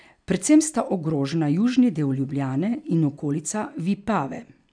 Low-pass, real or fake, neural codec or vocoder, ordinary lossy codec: 9.9 kHz; real; none; none